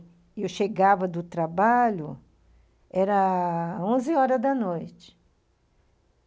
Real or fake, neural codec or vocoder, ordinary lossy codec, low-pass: real; none; none; none